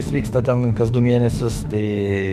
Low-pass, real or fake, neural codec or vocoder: 14.4 kHz; fake; autoencoder, 48 kHz, 32 numbers a frame, DAC-VAE, trained on Japanese speech